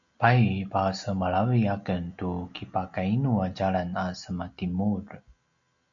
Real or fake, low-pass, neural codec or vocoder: real; 7.2 kHz; none